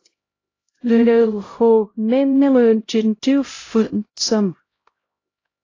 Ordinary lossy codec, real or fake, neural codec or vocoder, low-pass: AAC, 32 kbps; fake; codec, 16 kHz, 0.5 kbps, X-Codec, HuBERT features, trained on LibriSpeech; 7.2 kHz